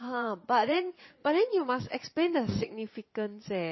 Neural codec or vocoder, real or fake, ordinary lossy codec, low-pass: vocoder, 22.05 kHz, 80 mel bands, Vocos; fake; MP3, 24 kbps; 7.2 kHz